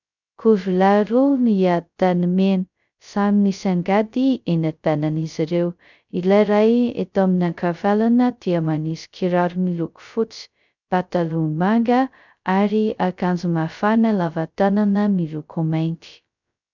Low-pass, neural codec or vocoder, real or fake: 7.2 kHz; codec, 16 kHz, 0.2 kbps, FocalCodec; fake